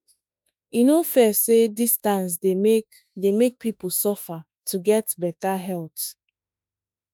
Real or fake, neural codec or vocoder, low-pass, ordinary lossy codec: fake; autoencoder, 48 kHz, 32 numbers a frame, DAC-VAE, trained on Japanese speech; none; none